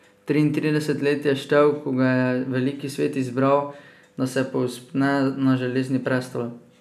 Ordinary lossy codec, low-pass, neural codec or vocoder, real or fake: none; 14.4 kHz; none; real